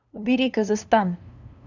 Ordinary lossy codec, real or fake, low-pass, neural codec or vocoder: none; fake; 7.2 kHz; codec, 16 kHz, 2 kbps, FunCodec, trained on LibriTTS, 25 frames a second